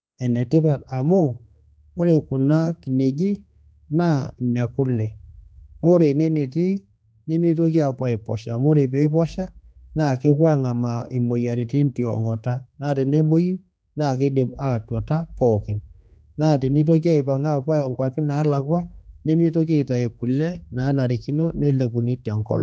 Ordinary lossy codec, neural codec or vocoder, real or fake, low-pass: none; codec, 16 kHz, 2 kbps, X-Codec, HuBERT features, trained on general audio; fake; none